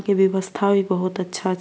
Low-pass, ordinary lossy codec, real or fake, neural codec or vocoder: none; none; real; none